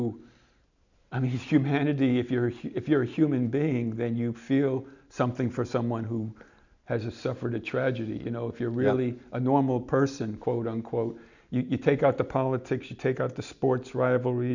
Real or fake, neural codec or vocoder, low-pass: real; none; 7.2 kHz